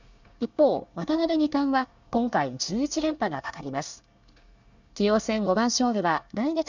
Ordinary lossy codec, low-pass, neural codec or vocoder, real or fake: none; 7.2 kHz; codec, 24 kHz, 1 kbps, SNAC; fake